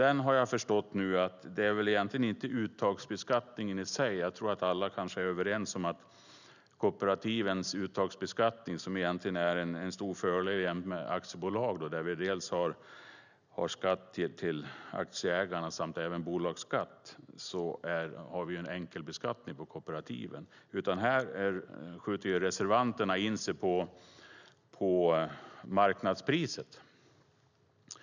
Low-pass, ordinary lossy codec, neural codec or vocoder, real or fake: 7.2 kHz; none; none; real